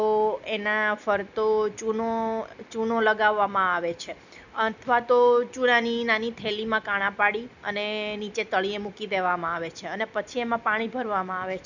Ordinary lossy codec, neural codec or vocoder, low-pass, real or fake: none; none; 7.2 kHz; real